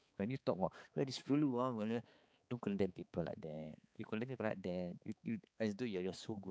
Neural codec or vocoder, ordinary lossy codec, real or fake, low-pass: codec, 16 kHz, 4 kbps, X-Codec, HuBERT features, trained on balanced general audio; none; fake; none